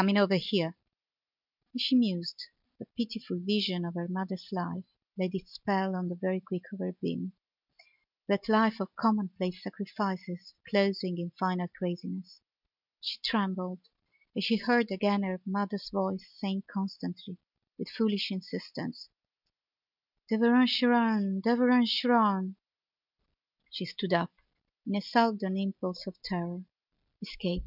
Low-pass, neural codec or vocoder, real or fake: 5.4 kHz; none; real